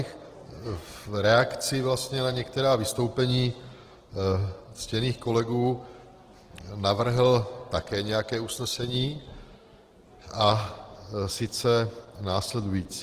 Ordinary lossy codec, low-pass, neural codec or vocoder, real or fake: Opus, 16 kbps; 14.4 kHz; none; real